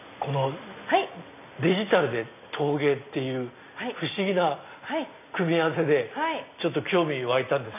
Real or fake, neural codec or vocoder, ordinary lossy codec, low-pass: real; none; none; 3.6 kHz